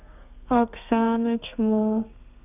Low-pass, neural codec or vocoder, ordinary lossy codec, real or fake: 3.6 kHz; codec, 32 kHz, 1.9 kbps, SNAC; none; fake